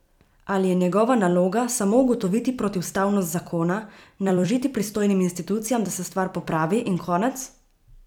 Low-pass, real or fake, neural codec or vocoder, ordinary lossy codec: 19.8 kHz; fake; vocoder, 44.1 kHz, 128 mel bands every 256 samples, BigVGAN v2; none